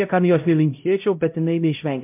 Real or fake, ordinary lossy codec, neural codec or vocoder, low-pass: fake; MP3, 32 kbps; codec, 16 kHz, 0.5 kbps, X-Codec, HuBERT features, trained on LibriSpeech; 3.6 kHz